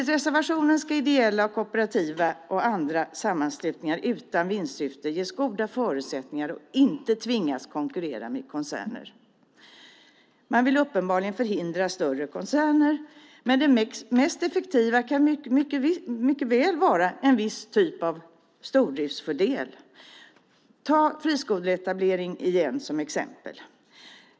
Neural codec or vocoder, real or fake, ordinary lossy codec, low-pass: none; real; none; none